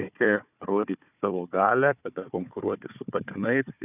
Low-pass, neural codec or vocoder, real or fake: 3.6 kHz; codec, 16 kHz, 4 kbps, FunCodec, trained on Chinese and English, 50 frames a second; fake